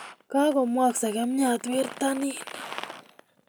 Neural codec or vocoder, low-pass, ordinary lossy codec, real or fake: none; none; none; real